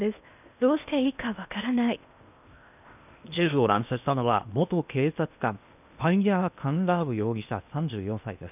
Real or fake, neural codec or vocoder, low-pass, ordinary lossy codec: fake; codec, 16 kHz in and 24 kHz out, 0.8 kbps, FocalCodec, streaming, 65536 codes; 3.6 kHz; none